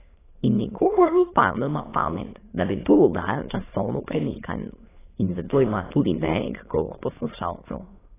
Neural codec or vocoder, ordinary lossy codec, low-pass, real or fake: autoencoder, 22.05 kHz, a latent of 192 numbers a frame, VITS, trained on many speakers; AAC, 16 kbps; 3.6 kHz; fake